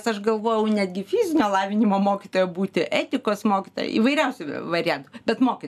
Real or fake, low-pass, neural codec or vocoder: real; 14.4 kHz; none